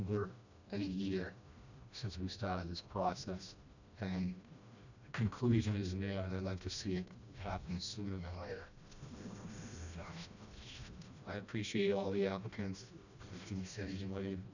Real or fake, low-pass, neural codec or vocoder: fake; 7.2 kHz; codec, 16 kHz, 1 kbps, FreqCodec, smaller model